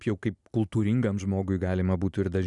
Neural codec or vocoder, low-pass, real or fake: none; 10.8 kHz; real